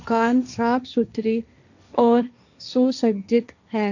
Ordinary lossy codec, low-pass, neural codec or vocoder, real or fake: none; 7.2 kHz; codec, 16 kHz, 1.1 kbps, Voila-Tokenizer; fake